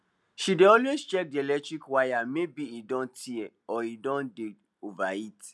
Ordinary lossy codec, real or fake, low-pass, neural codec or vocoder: none; real; none; none